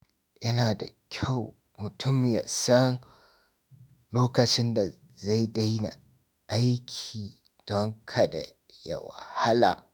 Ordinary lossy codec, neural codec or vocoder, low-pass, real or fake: none; autoencoder, 48 kHz, 32 numbers a frame, DAC-VAE, trained on Japanese speech; none; fake